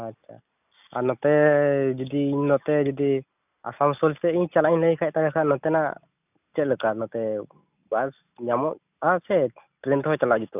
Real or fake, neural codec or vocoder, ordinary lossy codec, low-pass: real; none; none; 3.6 kHz